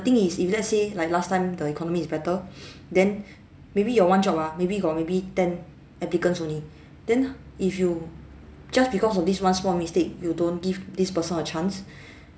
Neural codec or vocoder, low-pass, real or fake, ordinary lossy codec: none; none; real; none